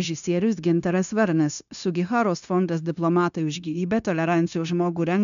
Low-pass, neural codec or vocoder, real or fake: 7.2 kHz; codec, 16 kHz, 0.9 kbps, LongCat-Audio-Codec; fake